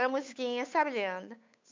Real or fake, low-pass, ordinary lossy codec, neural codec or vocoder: fake; 7.2 kHz; MP3, 64 kbps; codec, 16 kHz, 8 kbps, FunCodec, trained on Chinese and English, 25 frames a second